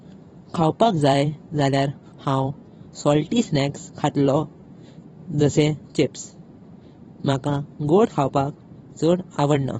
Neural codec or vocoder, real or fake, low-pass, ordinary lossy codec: vocoder, 44.1 kHz, 128 mel bands every 256 samples, BigVGAN v2; fake; 19.8 kHz; AAC, 24 kbps